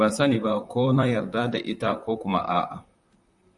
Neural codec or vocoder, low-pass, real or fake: vocoder, 44.1 kHz, 128 mel bands, Pupu-Vocoder; 10.8 kHz; fake